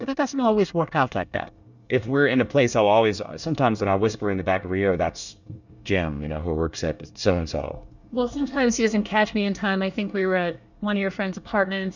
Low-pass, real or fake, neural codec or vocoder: 7.2 kHz; fake; codec, 24 kHz, 1 kbps, SNAC